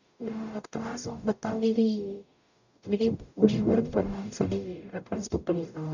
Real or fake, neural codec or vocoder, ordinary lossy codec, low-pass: fake; codec, 44.1 kHz, 0.9 kbps, DAC; none; 7.2 kHz